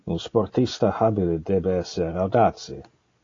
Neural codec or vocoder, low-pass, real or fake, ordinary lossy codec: none; 7.2 kHz; real; AAC, 32 kbps